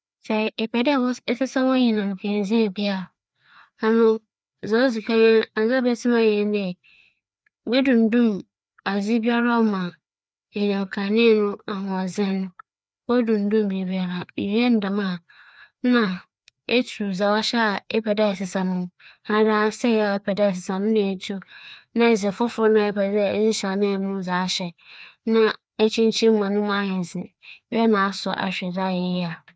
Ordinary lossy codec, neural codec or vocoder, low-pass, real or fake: none; codec, 16 kHz, 2 kbps, FreqCodec, larger model; none; fake